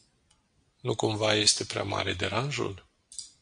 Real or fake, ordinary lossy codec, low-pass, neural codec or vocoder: real; AAC, 64 kbps; 9.9 kHz; none